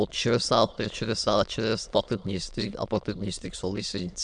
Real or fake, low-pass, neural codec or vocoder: fake; 9.9 kHz; autoencoder, 22.05 kHz, a latent of 192 numbers a frame, VITS, trained on many speakers